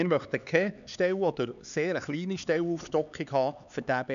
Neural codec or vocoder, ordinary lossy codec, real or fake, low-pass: codec, 16 kHz, 4 kbps, X-Codec, HuBERT features, trained on LibriSpeech; none; fake; 7.2 kHz